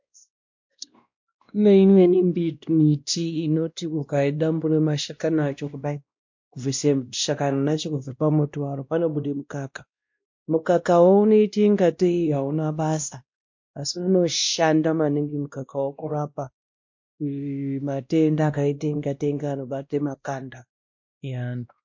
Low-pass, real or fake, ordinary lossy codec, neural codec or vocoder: 7.2 kHz; fake; MP3, 48 kbps; codec, 16 kHz, 1 kbps, X-Codec, WavLM features, trained on Multilingual LibriSpeech